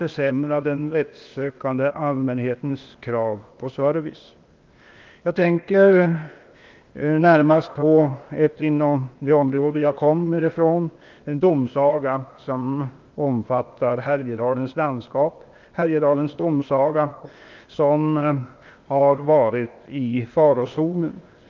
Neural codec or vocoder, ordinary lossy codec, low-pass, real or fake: codec, 16 kHz, 0.8 kbps, ZipCodec; Opus, 24 kbps; 7.2 kHz; fake